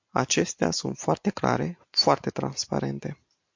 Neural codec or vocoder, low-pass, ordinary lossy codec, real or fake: none; 7.2 kHz; MP3, 48 kbps; real